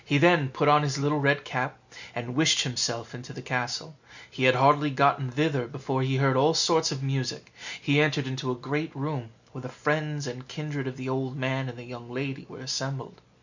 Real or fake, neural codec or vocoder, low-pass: real; none; 7.2 kHz